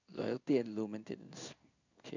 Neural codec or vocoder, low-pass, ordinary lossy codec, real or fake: codec, 16 kHz in and 24 kHz out, 1 kbps, XY-Tokenizer; 7.2 kHz; none; fake